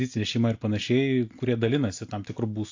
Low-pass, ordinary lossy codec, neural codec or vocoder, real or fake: 7.2 kHz; AAC, 48 kbps; none; real